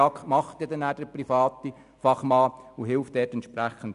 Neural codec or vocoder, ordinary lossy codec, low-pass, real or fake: none; none; 10.8 kHz; real